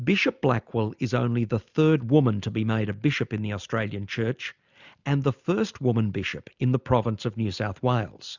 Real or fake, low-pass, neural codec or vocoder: real; 7.2 kHz; none